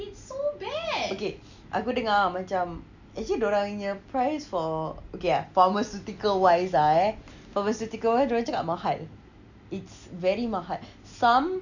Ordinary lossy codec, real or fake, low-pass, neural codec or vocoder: none; real; 7.2 kHz; none